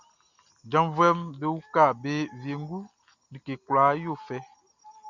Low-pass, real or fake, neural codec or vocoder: 7.2 kHz; real; none